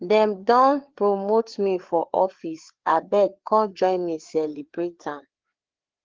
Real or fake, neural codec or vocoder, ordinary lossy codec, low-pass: fake; codec, 16 kHz, 4 kbps, FreqCodec, larger model; Opus, 16 kbps; 7.2 kHz